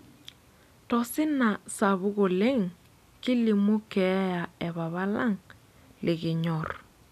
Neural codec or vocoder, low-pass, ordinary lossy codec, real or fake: none; 14.4 kHz; none; real